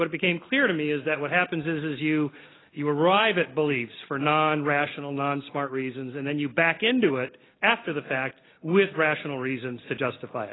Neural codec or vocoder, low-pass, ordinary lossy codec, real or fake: none; 7.2 kHz; AAC, 16 kbps; real